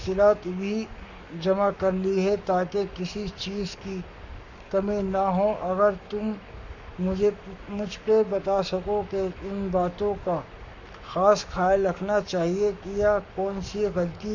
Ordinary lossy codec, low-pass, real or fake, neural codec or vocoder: none; 7.2 kHz; fake; codec, 44.1 kHz, 7.8 kbps, Pupu-Codec